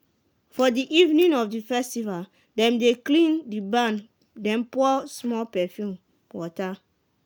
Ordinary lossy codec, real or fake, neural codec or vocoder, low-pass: none; real; none; none